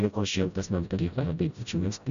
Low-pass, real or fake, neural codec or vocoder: 7.2 kHz; fake; codec, 16 kHz, 0.5 kbps, FreqCodec, smaller model